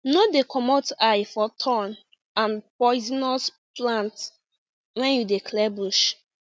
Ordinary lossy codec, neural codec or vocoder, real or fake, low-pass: none; none; real; none